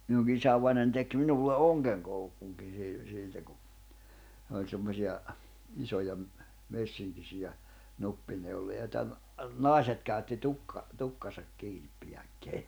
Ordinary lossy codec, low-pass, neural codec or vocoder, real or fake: none; none; none; real